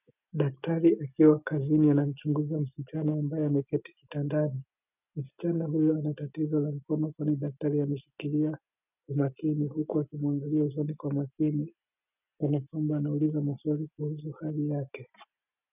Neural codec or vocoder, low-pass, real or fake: none; 3.6 kHz; real